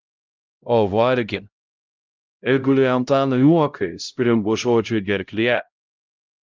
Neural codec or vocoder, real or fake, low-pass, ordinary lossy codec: codec, 16 kHz, 0.5 kbps, X-Codec, HuBERT features, trained on LibriSpeech; fake; 7.2 kHz; Opus, 24 kbps